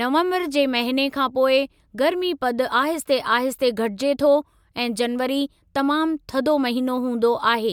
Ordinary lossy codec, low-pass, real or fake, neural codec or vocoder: MP3, 96 kbps; 19.8 kHz; real; none